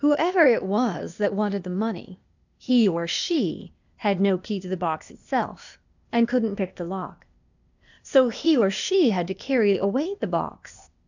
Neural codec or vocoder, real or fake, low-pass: codec, 16 kHz, 0.8 kbps, ZipCodec; fake; 7.2 kHz